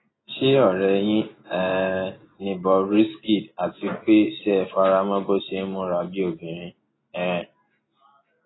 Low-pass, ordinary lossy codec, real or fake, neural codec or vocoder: 7.2 kHz; AAC, 16 kbps; real; none